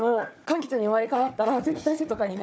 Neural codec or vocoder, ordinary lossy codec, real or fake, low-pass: codec, 16 kHz, 4 kbps, FunCodec, trained on Chinese and English, 50 frames a second; none; fake; none